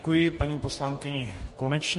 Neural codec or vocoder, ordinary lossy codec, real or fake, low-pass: codec, 44.1 kHz, 2.6 kbps, DAC; MP3, 48 kbps; fake; 14.4 kHz